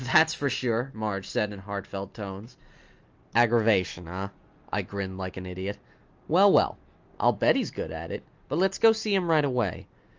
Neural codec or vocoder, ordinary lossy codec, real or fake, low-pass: none; Opus, 24 kbps; real; 7.2 kHz